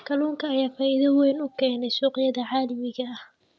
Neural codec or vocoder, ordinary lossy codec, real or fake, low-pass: none; none; real; none